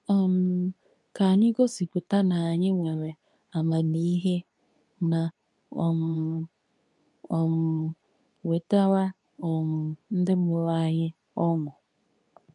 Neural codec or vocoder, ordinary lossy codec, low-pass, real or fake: codec, 24 kHz, 0.9 kbps, WavTokenizer, medium speech release version 2; none; 10.8 kHz; fake